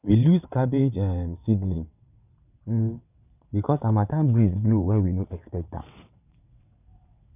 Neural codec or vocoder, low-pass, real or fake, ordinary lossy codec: vocoder, 22.05 kHz, 80 mel bands, WaveNeXt; 3.6 kHz; fake; none